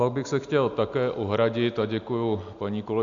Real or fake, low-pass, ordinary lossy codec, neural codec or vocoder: real; 7.2 kHz; MP3, 64 kbps; none